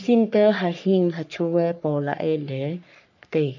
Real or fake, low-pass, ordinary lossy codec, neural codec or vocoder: fake; 7.2 kHz; none; codec, 44.1 kHz, 3.4 kbps, Pupu-Codec